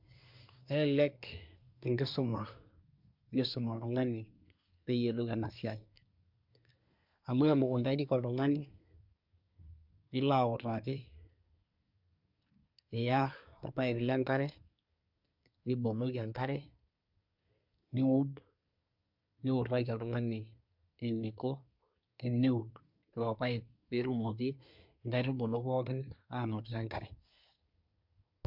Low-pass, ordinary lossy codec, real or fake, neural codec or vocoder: 5.4 kHz; MP3, 48 kbps; fake; codec, 24 kHz, 1 kbps, SNAC